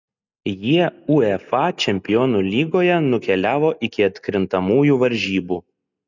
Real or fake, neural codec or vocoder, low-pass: real; none; 7.2 kHz